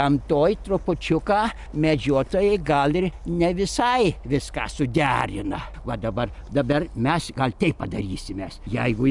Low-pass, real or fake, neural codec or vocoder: 10.8 kHz; real; none